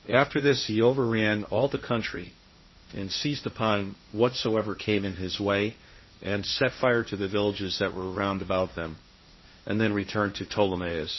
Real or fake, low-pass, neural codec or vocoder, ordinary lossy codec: fake; 7.2 kHz; codec, 16 kHz, 1.1 kbps, Voila-Tokenizer; MP3, 24 kbps